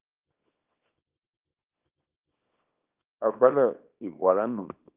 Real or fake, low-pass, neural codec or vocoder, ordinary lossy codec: fake; 3.6 kHz; codec, 24 kHz, 0.9 kbps, WavTokenizer, small release; Opus, 32 kbps